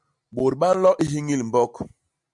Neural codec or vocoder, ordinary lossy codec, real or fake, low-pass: none; MP3, 96 kbps; real; 10.8 kHz